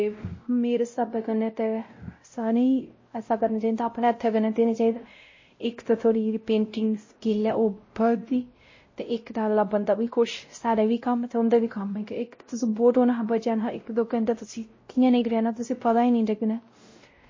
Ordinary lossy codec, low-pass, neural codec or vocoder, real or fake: MP3, 32 kbps; 7.2 kHz; codec, 16 kHz, 0.5 kbps, X-Codec, WavLM features, trained on Multilingual LibriSpeech; fake